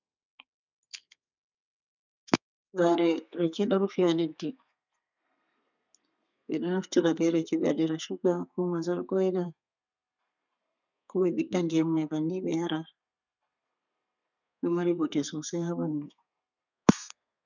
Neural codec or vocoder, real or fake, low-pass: codec, 32 kHz, 1.9 kbps, SNAC; fake; 7.2 kHz